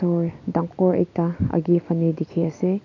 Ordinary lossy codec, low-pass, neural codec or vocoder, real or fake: none; 7.2 kHz; none; real